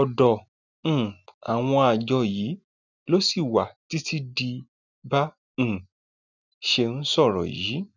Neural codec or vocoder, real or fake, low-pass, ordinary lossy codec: none; real; 7.2 kHz; none